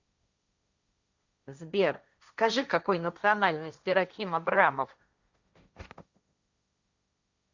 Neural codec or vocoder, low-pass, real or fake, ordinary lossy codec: codec, 16 kHz, 1.1 kbps, Voila-Tokenizer; 7.2 kHz; fake; Opus, 64 kbps